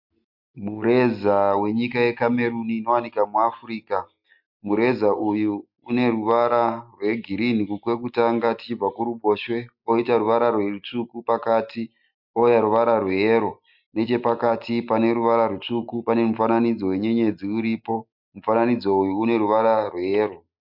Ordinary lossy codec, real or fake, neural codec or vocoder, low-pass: MP3, 48 kbps; real; none; 5.4 kHz